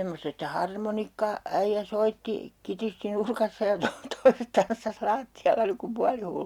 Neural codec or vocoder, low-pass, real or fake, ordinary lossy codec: none; 19.8 kHz; real; Opus, 64 kbps